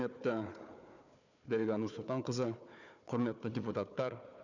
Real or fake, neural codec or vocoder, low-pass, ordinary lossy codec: fake; codec, 16 kHz, 4 kbps, FunCodec, trained on Chinese and English, 50 frames a second; 7.2 kHz; none